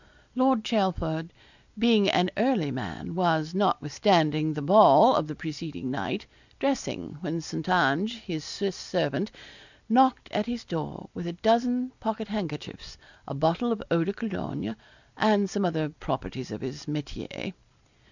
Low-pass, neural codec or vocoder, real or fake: 7.2 kHz; none; real